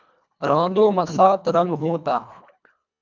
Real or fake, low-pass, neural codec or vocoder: fake; 7.2 kHz; codec, 24 kHz, 1.5 kbps, HILCodec